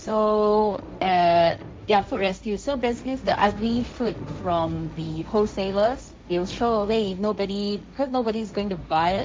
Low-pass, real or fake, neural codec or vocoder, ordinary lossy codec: none; fake; codec, 16 kHz, 1.1 kbps, Voila-Tokenizer; none